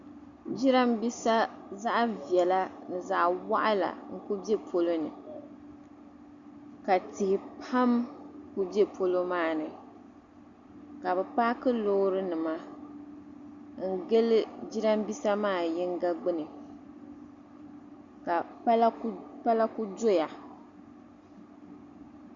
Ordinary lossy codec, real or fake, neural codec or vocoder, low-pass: Opus, 64 kbps; real; none; 7.2 kHz